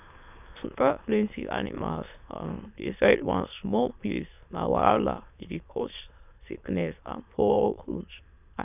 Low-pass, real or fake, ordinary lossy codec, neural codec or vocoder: 3.6 kHz; fake; none; autoencoder, 22.05 kHz, a latent of 192 numbers a frame, VITS, trained on many speakers